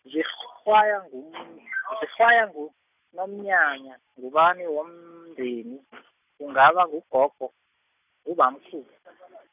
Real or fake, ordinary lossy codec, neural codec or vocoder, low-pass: real; none; none; 3.6 kHz